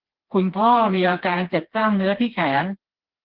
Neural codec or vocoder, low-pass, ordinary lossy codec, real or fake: codec, 16 kHz, 2 kbps, FreqCodec, smaller model; 5.4 kHz; Opus, 16 kbps; fake